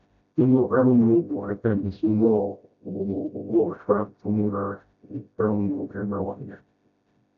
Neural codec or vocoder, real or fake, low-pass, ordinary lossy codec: codec, 16 kHz, 0.5 kbps, FreqCodec, smaller model; fake; 7.2 kHz; MP3, 96 kbps